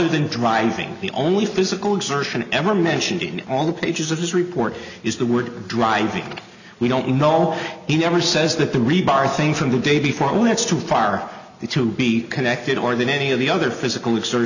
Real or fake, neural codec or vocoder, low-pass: real; none; 7.2 kHz